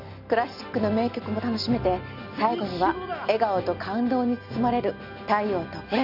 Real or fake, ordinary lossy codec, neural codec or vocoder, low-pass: real; none; none; 5.4 kHz